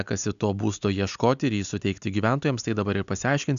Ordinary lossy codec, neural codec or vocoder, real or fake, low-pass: MP3, 96 kbps; none; real; 7.2 kHz